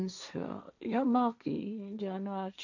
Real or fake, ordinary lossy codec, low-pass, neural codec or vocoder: fake; none; 7.2 kHz; codec, 16 kHz, 1.1 kbps, Voila-Tokenizer